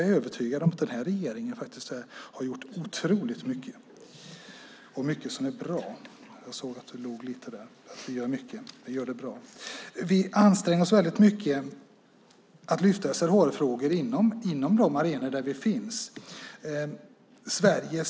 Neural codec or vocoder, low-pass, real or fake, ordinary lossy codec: none; none; real; none